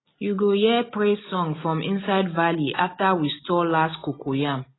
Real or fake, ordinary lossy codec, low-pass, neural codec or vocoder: real; AAC, 16 kbps; 7.2 kHz; none